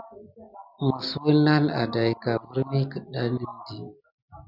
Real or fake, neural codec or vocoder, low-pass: real; none; 5.4 kHz